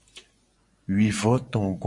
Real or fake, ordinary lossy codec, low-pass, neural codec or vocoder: fake; MP3, 48 kbps; 10.8 kHz; vocoder, 24 kHz, 100 mel bands, Vocos